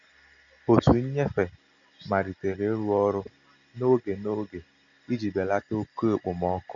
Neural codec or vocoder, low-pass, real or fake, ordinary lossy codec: none; 7.2 kHz; real; Opus, 64 kbps